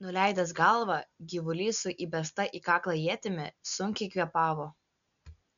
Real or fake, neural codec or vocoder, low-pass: real; none; 7.2 kHz